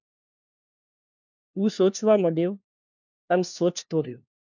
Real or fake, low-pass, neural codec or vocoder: fake; 7.2 kHz; codec, 16 kHz, 1 kbps, FunCodec, trained on LibriTTS, 50 frames a second